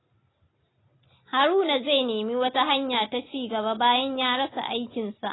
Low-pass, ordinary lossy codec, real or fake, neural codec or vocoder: 7.2 kHz; AAC, 16 kbps; real; none